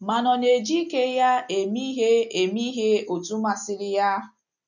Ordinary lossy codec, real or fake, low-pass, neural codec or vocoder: none; real; 7.2 kHz; none